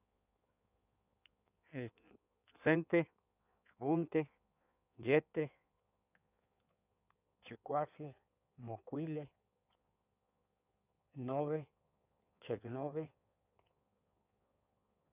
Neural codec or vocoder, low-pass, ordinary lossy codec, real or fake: codec, 16 kHz in and 24 kHz out, 1.1 kbps, FireRedTTS-2 codec; 3.6 kHz; none; fake